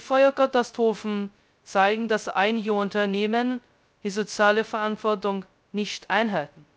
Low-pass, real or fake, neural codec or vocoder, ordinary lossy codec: none; fake; codec, 16 kHz, 0.2 kbps, FocalCodec; none